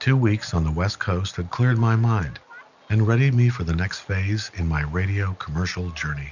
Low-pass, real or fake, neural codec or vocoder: 7.2 kHz; real; none